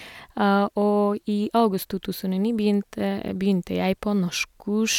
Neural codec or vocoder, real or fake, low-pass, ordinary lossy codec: none; real; 19.8 kHz; none